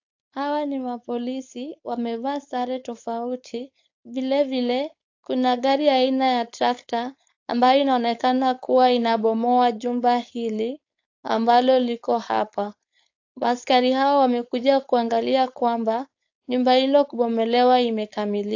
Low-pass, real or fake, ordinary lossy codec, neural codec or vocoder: 7.2 kHz; fake; AAC, 48 kbps; codec, 16 kHz, 4.8 kbps, FACodec